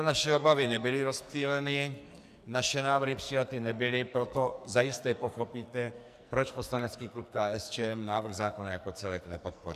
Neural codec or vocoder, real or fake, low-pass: codec, 44.1 kHz, 2.6 kbps, SNAC; fake; 14.4 kHz